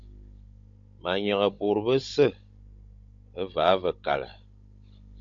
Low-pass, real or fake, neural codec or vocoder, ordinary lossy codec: 7.2 kHz; fake; codec, 16 kHz, 16 kbps, FunCodec, trained on Chinese and English, 50 frames a second; MP3, 48 kbps